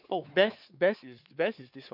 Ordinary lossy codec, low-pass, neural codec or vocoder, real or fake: AAC, 48 kbps; 5.4 kHz; codec, 16 kHz, 4 kbps, X-Codec, WavLM features, trained on Multilingual LibriSpeech; fake